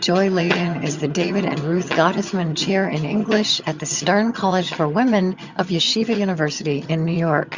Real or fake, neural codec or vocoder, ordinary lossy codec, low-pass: fake; vocoder, 22.05 kHz, 80 mel bands, HiFi-GAN; Opus, 64 kbps; 7.2 kHz